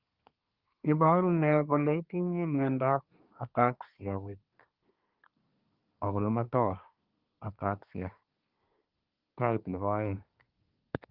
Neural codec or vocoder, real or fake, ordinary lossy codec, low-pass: codec, 24 kHz, 1 kbps, SNAC; fake; Opus, 32 kbps; 5.4 kHz